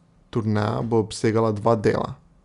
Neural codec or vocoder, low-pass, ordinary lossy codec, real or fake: none; 10.8 kHz; none; real